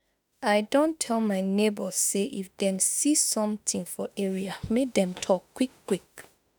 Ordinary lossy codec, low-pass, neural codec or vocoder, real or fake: none; none; autoencoder, 48 kHz, 32 numbers a frame, DAC-VAE, trained on Japanese speech; fake